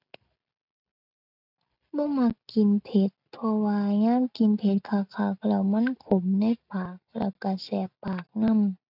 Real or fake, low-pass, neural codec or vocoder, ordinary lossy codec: real; 5.4 kHz; none; none